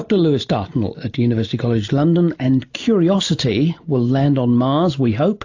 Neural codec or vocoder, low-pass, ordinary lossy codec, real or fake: none; 7.2 kHz; AAC, 48 kbps; real